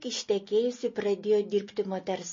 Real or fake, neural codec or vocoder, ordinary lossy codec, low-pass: real; none; MP3, 32 kbps; 7.2 kHz